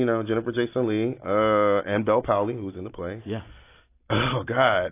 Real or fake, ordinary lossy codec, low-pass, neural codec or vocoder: real; AAC, 24 kbps; 3.6 kHz; none